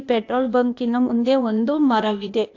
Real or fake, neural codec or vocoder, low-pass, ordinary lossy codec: fake; codec, 16 kHz, 0.8 kbps, ZipCodec; 7.2 kHz; AAC, 48 kbps